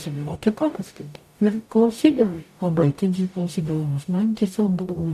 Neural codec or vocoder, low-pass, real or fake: codec, 44.1 kHz, 0.9 kbps, DAC; 14.4 kHz; fake